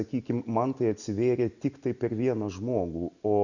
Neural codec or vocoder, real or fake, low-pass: none; real; 7.2 kHz